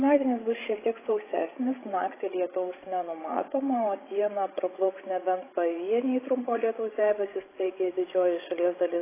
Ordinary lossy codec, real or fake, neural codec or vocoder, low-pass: AAC, 16 kbps; fake; codec, 16 kHz, 16 kbps, FreqCodec, larger model; 3.6 kHz